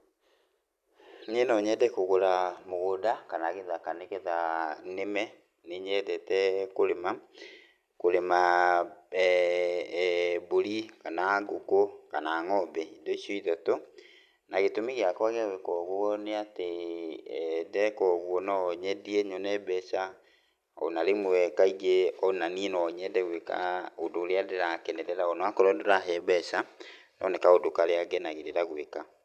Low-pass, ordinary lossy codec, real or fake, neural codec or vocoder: 14.4 kHz; none; real; none